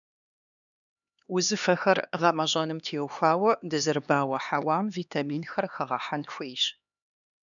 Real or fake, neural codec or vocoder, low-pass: fake; codec, 16 kHz, 2 kbps, X-Codec, HuBERT features, trained on LibriSpeech; 7.2 kHz